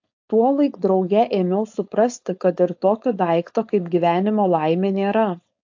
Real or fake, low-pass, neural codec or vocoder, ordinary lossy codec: fake; 7.2 kHz; codec, 16 kHz, 4.8 kbps, FACodec; AAC, 48 kbps